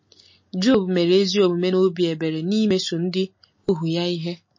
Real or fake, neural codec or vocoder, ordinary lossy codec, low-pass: real; none; MP3, 32 kbps; 7.2 kHz